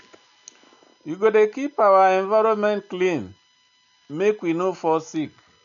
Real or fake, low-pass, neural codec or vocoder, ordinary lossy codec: real; 7.2 kHz; none; none